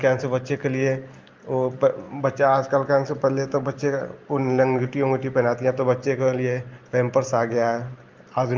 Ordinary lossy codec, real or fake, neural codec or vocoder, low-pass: Opus, 32 kbps; real; none; 7.2 kHz